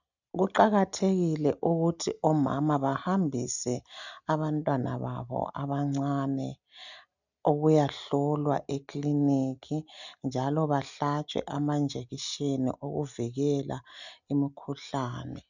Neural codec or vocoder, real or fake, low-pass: none; real; 7.2 kHz